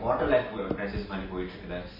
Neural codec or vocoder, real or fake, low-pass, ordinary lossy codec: none; real; 5.4 kHz; MP3, 24 kbps